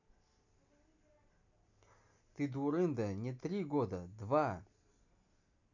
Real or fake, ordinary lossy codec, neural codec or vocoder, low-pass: real; none; none; 7.2 kHz